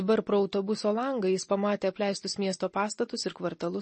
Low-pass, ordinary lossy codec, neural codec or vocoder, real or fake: 10.8 kHz; MP3, 32 kbps; none; real